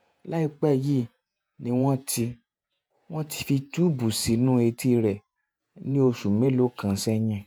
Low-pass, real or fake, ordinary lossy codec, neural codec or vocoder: none; fake; none; vocoder, 48 kHz, 128 mel bands, Vocos